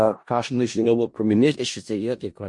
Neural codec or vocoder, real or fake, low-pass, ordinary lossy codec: codec, 16 kHz in and 24 kHz out, 0.4 kbps, LongCat-Audio-Codec, four codebook decoder; fake; 10.8 kHz; MP3, 48 kbps